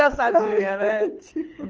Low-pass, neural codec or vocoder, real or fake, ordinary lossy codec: 7.2 kHz; codec, 24 kHz, 6 kbps, HILCodec; fake; Opus, 24 kbps